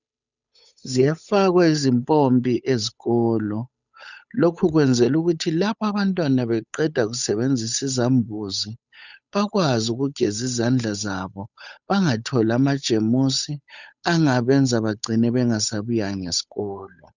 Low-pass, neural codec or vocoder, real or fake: 7.2 kHz; codec, 16 kHz, 8 kbps, FunCodec, trained on Chinese and English, 25 frames a second; fake